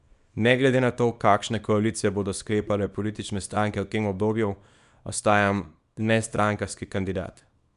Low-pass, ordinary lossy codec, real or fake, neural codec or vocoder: 10.8 kHz; none; fake; codec, 24 kHz, 0.9 kbps, WavTokenizer, small release